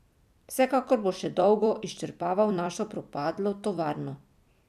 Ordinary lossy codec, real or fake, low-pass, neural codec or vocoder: none; fake; 14.4 kHz; vocoder, 44.1 kHz, 128 mel bands every 256 samples, BigVGAN v2